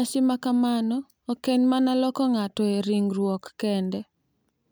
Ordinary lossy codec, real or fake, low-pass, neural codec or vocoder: none; real; none; none